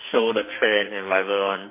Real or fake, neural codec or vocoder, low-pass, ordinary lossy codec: fake; codec, 44.1 kHz, 2.6 kbps, SNAC; 3.6 kHz; MP3, 24 kbps